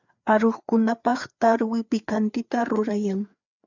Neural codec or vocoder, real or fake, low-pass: codec, 16 kHz, 4 kbps, FreqCodec, larger model; fake; 7.2 kHz